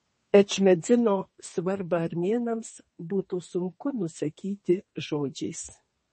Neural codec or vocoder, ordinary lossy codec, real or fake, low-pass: codec, 32 kHz, 1.9 kbps, SNAC; MP3, 32 kbps; fake; 10.8 kHz